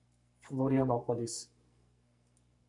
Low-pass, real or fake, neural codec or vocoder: 10.8 kHz; fake; codec, 44.1 kHz, 2.6 kbps, SNAC